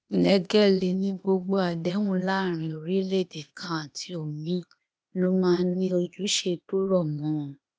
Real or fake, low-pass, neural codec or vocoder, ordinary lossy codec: fake; none; codec, 16 kHz, 0.8 kbps, ZipCodec; none